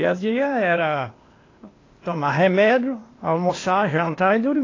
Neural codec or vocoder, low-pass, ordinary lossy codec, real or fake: codec, 16 kHz, 0.8 kbps, ZipCodec; 7.2 kHz; AAC, 32 kbps; fake